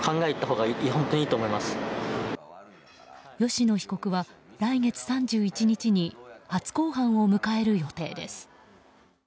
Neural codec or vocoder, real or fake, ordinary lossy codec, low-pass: none; real; none; none